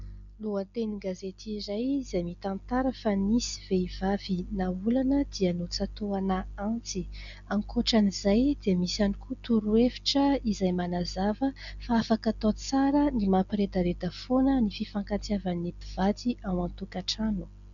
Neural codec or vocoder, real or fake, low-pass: none; real; 7.2 kHz